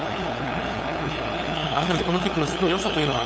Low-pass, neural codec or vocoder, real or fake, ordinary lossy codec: none; codec, 16 kHz, 8 kbps, FunCodec, trained on LibriTTS, 25 frames a second; fake; none